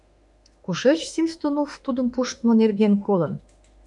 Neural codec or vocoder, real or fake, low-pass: autoencoder, 48 kHz, 32 numbers a frame, DAC-VAE, trained on Japanese speech; fake; 10.8 kHz